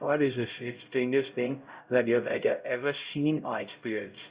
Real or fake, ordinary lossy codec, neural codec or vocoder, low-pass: fake; Opus, 64 kbps; codec, 16 kHz, 0.5 kbps, X-Codec, HuBERT features, trained on LibriSpeech; 3.6 kHz